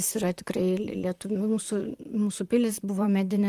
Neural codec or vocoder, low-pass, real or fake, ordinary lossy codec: vocoder, 44.1 kHz, 128 mel bands, Pupu-Vocoder; 14.4 kHz; fake; Opus, 24 kbps